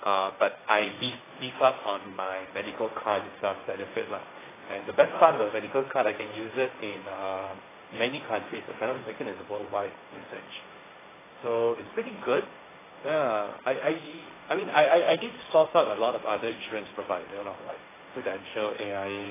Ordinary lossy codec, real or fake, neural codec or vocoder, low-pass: AAC, 16 kbps; fake; codec, 16 kHz, 1.1 kbps, Voila-Tokenizer; 3.6 kHz